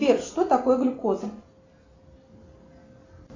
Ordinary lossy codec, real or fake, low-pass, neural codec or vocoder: AAC, 32 kbps; real; 7.2 kHz; none